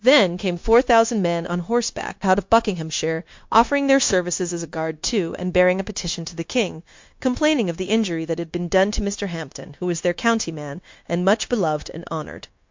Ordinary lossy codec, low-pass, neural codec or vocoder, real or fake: MP3, 48 kbps; 7.2 kHz; codec, 16 kHz, 0.9 kbps, LongCat-Audio-Codec; fake